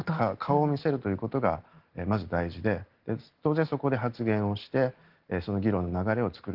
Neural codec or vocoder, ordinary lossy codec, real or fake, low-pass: none; Opus, 16 kbps; real; 5.4 kHz